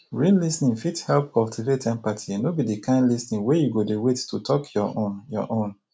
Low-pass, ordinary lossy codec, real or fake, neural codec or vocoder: none; none; real; none